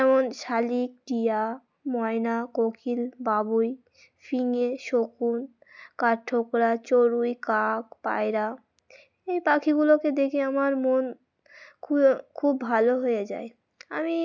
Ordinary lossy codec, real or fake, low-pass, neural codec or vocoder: none; real; 7.2 kHz; none